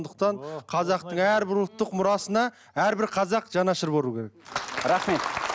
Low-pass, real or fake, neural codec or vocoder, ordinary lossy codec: none; real; none; none